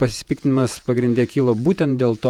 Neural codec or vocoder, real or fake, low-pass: vocoder, 48 kHz, 128 mel bands, Vocos; fake; 19.8 kHz